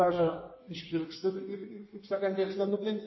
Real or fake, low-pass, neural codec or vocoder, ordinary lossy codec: fake; 7.2 kHz; codec, 16 kHz in and 24 kHz out, 1.1 kbps, FireRedTTS-2 codec; MP3, 24 kbps